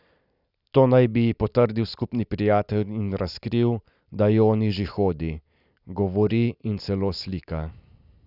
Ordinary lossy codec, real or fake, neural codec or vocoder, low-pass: none; real; none; 5.4 kHz